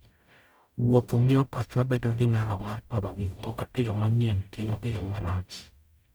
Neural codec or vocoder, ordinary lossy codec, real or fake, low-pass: codec, 44.1 kHz, 0.9 kbps, DAC; none; fake; none